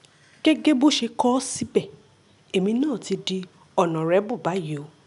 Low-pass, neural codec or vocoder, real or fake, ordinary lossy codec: 10.8 kHz; none; real; none